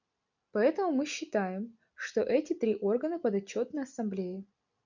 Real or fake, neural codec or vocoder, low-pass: real; none; 7.2 kHz